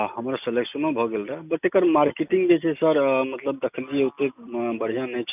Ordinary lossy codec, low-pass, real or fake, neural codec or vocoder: none; 3.6 kHz; real; none